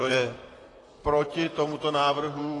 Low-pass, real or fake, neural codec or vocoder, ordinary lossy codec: 10.8 kHz; fake; vocoder, 44.1 kHz, 128 mel bands, Pupu-Vocoder; AAC, 32 kbps